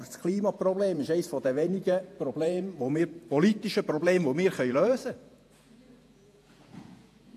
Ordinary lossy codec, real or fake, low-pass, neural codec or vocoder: AAC, 64 kbps; fake; 14.4 kHz; vocoder, 48 kHz, 128 mel bands, Vocos